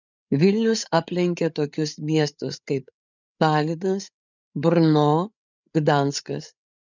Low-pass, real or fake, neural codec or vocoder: 7.2 kHz; fake; codec, 16 kHz, 8 kbps, FreqCodec, larger model